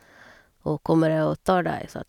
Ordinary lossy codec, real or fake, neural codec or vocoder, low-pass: none; real; none; 19.8 kHz